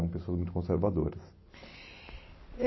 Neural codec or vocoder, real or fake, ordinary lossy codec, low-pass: none; real; MP3, 24 kbps; 7.2 kHz